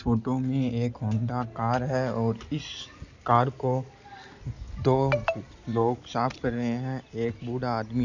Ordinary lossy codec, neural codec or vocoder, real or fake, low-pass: none; none; real; 7.2 kHz